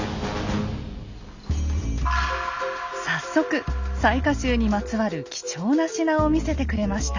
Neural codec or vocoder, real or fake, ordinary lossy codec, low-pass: none; real; Opus, 64 kbps; 7.2 kHz